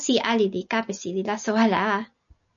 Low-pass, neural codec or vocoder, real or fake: 7.2 kHz; none; real